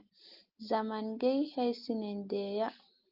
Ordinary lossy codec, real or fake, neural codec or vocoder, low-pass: Opus, 32 kbps; real; none; 5.4 kHz